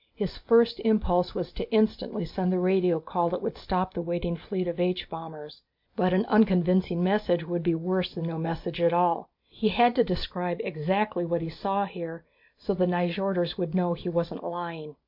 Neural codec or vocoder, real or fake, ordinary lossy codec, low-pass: none; real; MP3, 48 kbps; 5.4 kHz